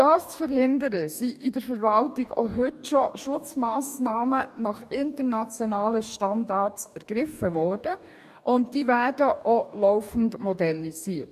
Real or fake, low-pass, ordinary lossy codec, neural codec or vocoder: fake; 14.4 kHz; none; codec, 44.1 kHz, 2.6 kbps, DAC